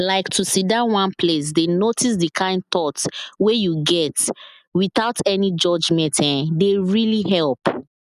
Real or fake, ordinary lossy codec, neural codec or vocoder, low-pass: real; none; none; 14.4 kHz